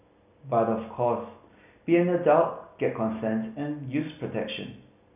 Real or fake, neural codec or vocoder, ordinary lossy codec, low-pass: real; none; none; 3.6 kHz